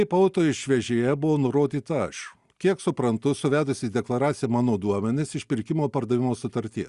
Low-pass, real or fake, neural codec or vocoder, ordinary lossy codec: 10.8 kHz; real; none; Opus, 64 kbps